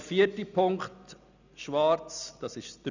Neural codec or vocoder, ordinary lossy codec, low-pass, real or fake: none; none; 7.2 kHz; real